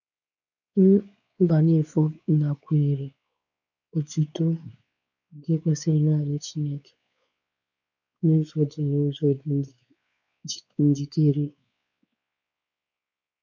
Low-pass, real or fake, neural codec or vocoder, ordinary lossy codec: 7.2 kHz; fake; codec, 16 kHz, 4 kbps, X-Codec, WavLM features, trained on Multilingual LibriSpeech; none